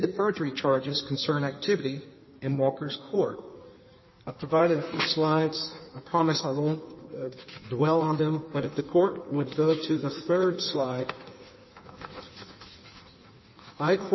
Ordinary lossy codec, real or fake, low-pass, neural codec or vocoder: MP3, 24 kbps; fake; 7.2 kHz; codec, 16 kHz in and 24 kHz out, 1.1 kbps, FireRedTTS-2 codec